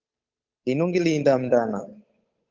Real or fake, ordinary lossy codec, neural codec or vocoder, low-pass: fake; Opus, 32 kbps; codec, 16 kHz, 8 kbps, FunCodec, trained on Chinese and English, 25 frames a second; 7.2 kHz